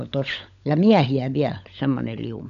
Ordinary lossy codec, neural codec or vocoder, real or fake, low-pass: none; codec, 16 kHz, 16 kbps, FunCodec, trained on LibriTTS, 50 frames a second; fake; 7.2 kHz